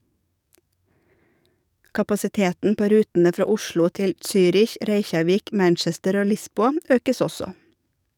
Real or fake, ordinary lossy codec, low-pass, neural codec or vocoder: fake; none; 19.8 kHz; codec, 44.1 kHz, 7.8 kbps, DAC